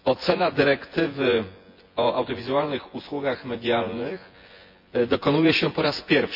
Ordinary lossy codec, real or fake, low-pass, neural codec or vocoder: MP3, 32 kbps; fake; 5.4 kHz; vocoder, 24 kHz, 100 mel bands, Vocos